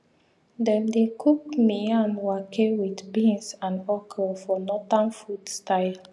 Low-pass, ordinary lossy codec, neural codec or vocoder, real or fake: none; none; none; real